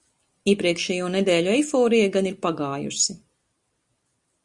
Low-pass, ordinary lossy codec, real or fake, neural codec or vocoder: 10.8 kHz; Opus, 64 kbps; real; none